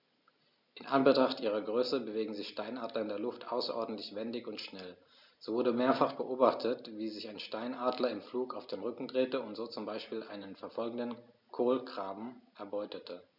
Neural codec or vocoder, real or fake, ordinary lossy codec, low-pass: none; real; none; 5.4 kHz